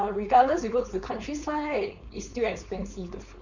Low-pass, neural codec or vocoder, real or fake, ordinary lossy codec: 7.2 kHz; codec, 16 kHz, 4.8 kbps, FACodec; fake; none